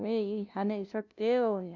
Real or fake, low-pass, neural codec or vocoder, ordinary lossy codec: fake; 7.2 kHz; codec, 16 kHz, 0.5 kbps, FunCodec, trained on LibriTTS, 25 frames a second; none